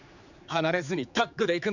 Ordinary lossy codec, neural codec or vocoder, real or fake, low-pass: none; codec, 16 kHz, 4 kbps, X-Codec, HuBERT features, trained on general audio; fake; 7.2 kHz